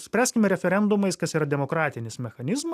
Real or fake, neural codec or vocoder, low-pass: real; none; 14.4 kHz